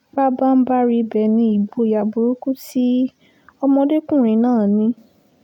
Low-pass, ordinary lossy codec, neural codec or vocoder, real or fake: 19.8 kHz; none; none; real